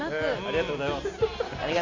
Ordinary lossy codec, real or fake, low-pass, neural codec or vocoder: MP3, 48 kbps; real; 7.2 kHz; none